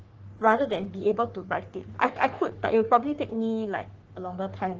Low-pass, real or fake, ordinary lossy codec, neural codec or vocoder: 7.2 kHz; fake; Opus, 16 kbps; codec, 44.1 kHz, 3.4 kbps, Pupu-Codec